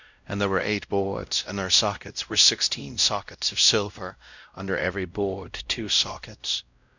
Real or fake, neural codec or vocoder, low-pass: fake; codec, 16 kHz, 0.5 kbps, X-Codec, WavLM features, trained on Multilingual LibriSpeech; 7.2 kHz